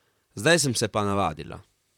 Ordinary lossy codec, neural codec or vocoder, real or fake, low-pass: none; vocoder, 44.1 kHz, 128 mel bands, Pupu-Vocoder; fake; 19.8 kHz